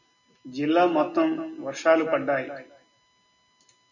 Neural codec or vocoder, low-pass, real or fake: none; 7.2 kHz; real